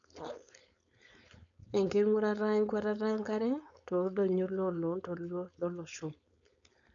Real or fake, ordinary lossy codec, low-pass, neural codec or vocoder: fake; none; 7.2 kHz; codec, 16 kHz, 4.8 kbps, FACodec